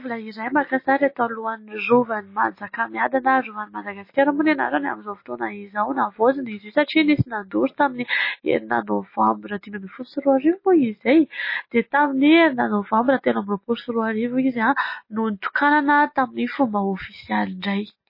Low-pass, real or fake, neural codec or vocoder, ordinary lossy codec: 5.4 kHz; real; none; MP3, 24 kbps